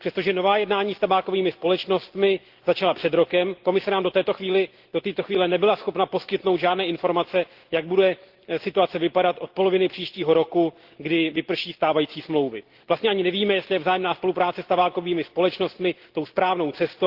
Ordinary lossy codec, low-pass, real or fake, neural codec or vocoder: Opus, 16 kbps; 5.4 kHz; real; none